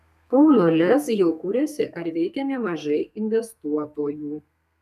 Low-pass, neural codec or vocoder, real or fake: 14.4 kHz; codec, 32 kHz, 1.9 kbps, SNAC; fake